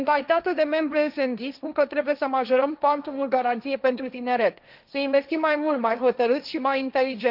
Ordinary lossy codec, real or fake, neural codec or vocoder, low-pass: none; fake; codec, 16 kHz, 1.1 kbps, Voila-Tokenizer; 5.4 kHz